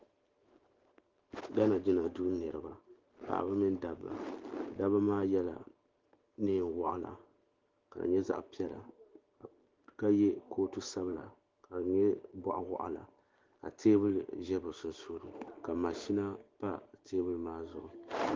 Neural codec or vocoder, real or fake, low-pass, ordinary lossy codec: none; real; 7.2 kHz; Opus, 16 kbps